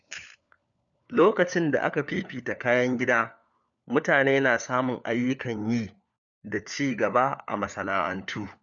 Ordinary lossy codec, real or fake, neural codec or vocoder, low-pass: none; fake; codec, 16 kHz, 4 kbps, FunCodec, trained on LibriTTS, 50 frames a second; 7.2 kHz